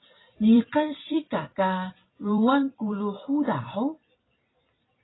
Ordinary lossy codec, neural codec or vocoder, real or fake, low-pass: AAC, 16 kbps; vocoder, 44.1 kHz, 128 mel bands every 256 samples, BigVGAN v2; fake; 7.2 kHz